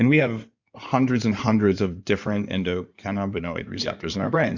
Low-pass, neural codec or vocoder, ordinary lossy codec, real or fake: 7.2 kHz; codec, 16 kHz in and 24 kHz out, 2.2 kbps, FireRedTTS-2 codec; Opus, 64 kbps; fake